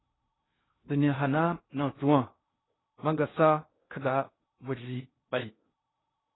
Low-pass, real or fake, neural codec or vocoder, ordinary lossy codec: 7.2 kHz; fake; codec, 16 kHz in and 24 kHz out, 0.6 kbps, FocalCodec, streaming, 2048 codes; AAC, 16 kbps